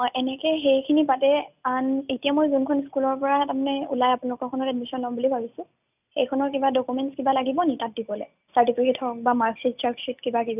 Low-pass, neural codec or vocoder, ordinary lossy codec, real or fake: 3.6 kHz; none; none; real